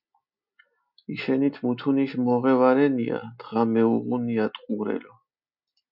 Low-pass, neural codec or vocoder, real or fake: 5.4 kHz; none; real